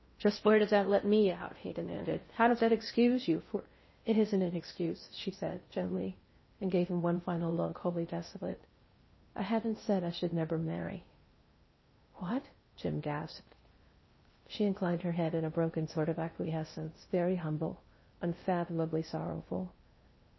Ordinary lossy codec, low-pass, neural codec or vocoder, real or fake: MP3, 24 kbps; 7.2 kHz; codec, 16 kHz in and 24 kHz out, 0.6 kbps, FocalCodec, streaming, 4096 codes; fake